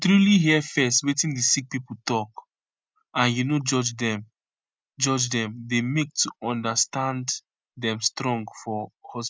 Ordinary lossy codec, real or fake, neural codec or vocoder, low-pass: none; real; none; none